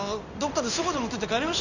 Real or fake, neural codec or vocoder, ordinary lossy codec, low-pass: fake; codec, 16 kHz in and 24 kHz out, 1 kbps, XY-Tokenizer; none; 7.2 kHz